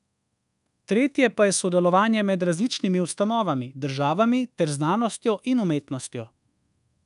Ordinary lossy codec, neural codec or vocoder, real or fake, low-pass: none; codec, 24 kHz, 1.2 kbps, DualCodec; fake; 10.8 kHz